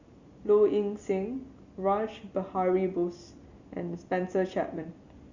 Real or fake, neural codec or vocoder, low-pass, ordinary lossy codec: real; none; 7.2 kHz; Opus, 64 kbps